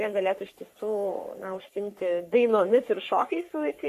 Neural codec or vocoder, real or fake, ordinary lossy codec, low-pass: codec, 44.1 kHz, 3.4 kbps, Pupu-Codec; fake; MP3, 64 kbps; 14.4 kHz